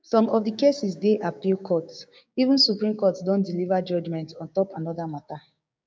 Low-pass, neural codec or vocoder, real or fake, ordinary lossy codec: none; codec, 16 kHz, 6 kbps, DAC; fake; none